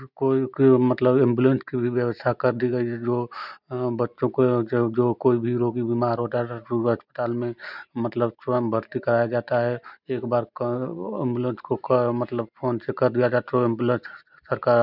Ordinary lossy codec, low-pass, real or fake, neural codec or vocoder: none; 5.4 kHz; real; none